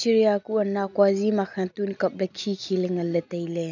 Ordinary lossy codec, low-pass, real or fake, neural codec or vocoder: none; 7.2 kHz; real; none